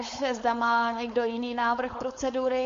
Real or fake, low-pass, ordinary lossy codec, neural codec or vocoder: fake; 7.2 kHz; MP3, 48 kbps; codec, 16 kHz, 4.8 kbps, FACodec